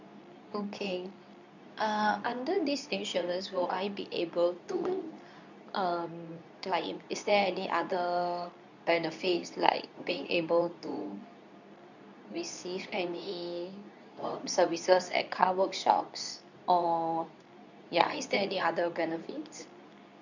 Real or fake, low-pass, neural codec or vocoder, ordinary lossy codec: fake; 7.2 kHz; codec, 24 kHz, 0.9 kbps, WavTokenizer, medium speech release version 2; none